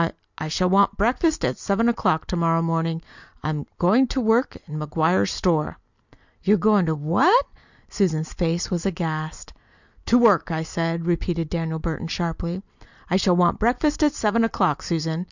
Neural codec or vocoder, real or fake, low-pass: none; real; 7.2 kHz